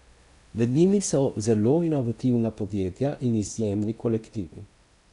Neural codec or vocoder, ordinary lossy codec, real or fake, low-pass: codec, 16 kHz in and 24 kHz out, 0.8 kbps, FocalCodec, streaming, 65536 codes; none; fake; 10.8 kHz